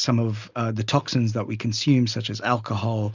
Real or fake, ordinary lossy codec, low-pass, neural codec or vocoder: real; Opus, 64 kbps; 7.2 kHz; none